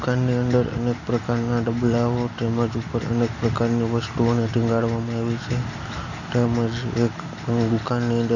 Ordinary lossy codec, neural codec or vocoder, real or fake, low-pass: none; none; real; 7.2 kHz